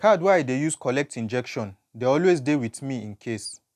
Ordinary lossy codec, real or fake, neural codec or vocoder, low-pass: none; real; none; 14.4 kHz